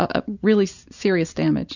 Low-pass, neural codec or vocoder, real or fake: 7.2 kHz; none; real